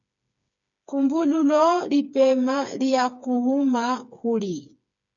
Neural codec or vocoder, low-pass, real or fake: codec, 16 kHz, 4 kbps, FreqCodec, smaller model; 7.2 kHz; fake